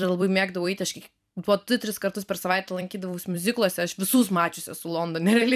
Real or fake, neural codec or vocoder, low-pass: fake; vocoder, 44.1 kHz, 128 mel bands every 256 samples, BigVGAN v2; 14.4 kHz